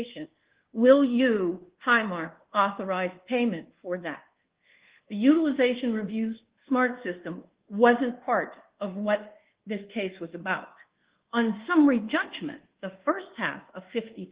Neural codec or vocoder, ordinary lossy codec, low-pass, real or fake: codec, 24 kHz, 1.2 kbps, DualCodec; Opus, 16 kbps; 3.6 kHz; fake